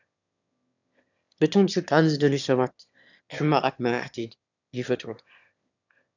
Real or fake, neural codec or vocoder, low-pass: fake; autoencoder, 22.05 kHz, a latent of 192 numbers a frame, VITS, trained on one speaker; 7.2 kHz